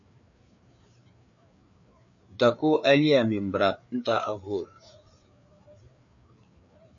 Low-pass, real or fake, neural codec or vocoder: 7.2 kHz; fake; codec, 16 kHz, 4 kbps, FreqCodec, larger model